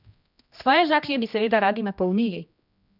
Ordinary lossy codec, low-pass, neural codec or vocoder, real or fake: none; 5.4 kHz; codec, 16 kHz, 1 kbps, X-Codec, HuBERT features, trained on general audio; fake